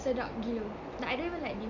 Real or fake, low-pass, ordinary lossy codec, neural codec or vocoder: real; 7.2 kHz; none; none